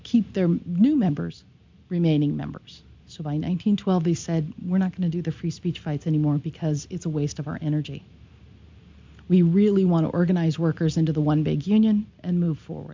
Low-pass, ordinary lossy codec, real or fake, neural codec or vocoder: 7.2 kHz; MP3, 64 kbps; real; none